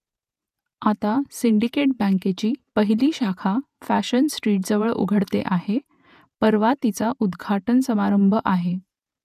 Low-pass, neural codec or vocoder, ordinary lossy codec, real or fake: 14.4 kHz; vocoder, 48 kHz, 128 mel bands, Vocos; none; fake